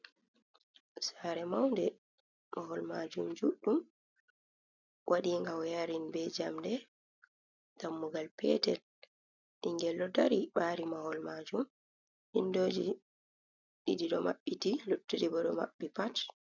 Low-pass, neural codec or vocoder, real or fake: 7.2 kHz; none; real